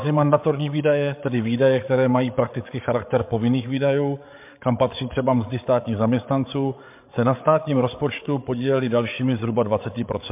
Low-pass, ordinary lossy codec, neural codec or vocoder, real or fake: 3.6 kHz; MP3, 32 kbps; codec, 16 kHz, 8 kbps, FreqCodec, larger model; fake